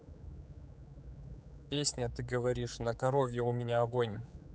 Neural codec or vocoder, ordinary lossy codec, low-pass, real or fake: codec, 16 kHz, 4 kbps, X-Codec, HuBERT features, trained on general audio; none; none; fake